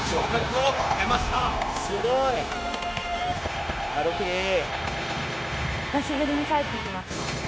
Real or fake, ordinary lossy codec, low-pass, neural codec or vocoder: fake; none; none; codec, 16 kHz, 0.9 kbps, LongCat-Audio-Codec